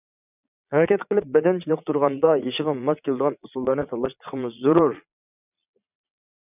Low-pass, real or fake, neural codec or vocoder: 3.6 kHz; fake; vocoder, 22.05 kHz, 80 mel bands, Vocos